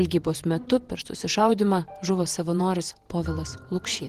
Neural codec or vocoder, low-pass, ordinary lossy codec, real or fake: vocoder, 48 kHz, 128 mel bands, Vocos; 14.4 kHz; Opus, 16 kbps; fake